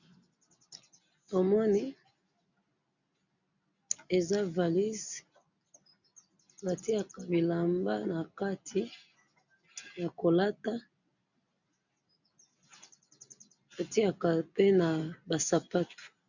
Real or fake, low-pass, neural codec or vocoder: real; 7.2 kHz; none